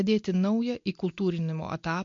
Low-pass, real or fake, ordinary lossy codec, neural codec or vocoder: 7.2 kHz; real; MP3, 48 kbps; none